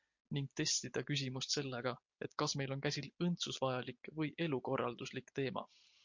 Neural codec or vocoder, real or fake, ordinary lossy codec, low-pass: none; real; MP3, 48 kbps; 7.2 kHz